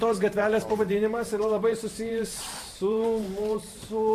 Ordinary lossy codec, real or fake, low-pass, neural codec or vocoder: AAC, 64 kbps; fake; 14.4 kHz; vocoder, 44.1 kHz, 128 mel bands every 512 samples, BigVGAN v2